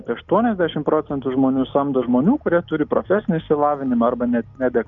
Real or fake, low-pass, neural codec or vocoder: real; 7.2 kHz; none